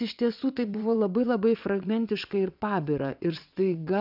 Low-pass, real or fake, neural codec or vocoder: 5.4 kHz; fake; vocoder, 22.05 kHz, 80 mel bands, WaveNeXt